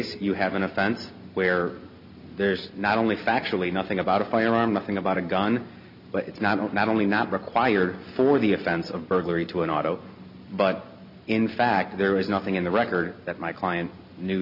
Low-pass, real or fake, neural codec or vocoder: 5.4 kHz; real; none